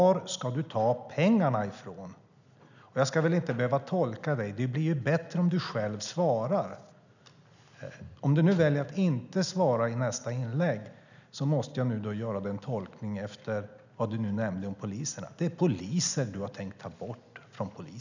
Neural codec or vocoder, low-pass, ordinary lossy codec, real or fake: none; 7.2 kHz; none; real